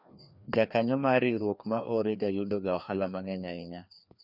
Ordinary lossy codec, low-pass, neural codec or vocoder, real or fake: none; 5.4 kHz; codec, 16 kHz, 2 kbps, FreqCodec, larger model; fake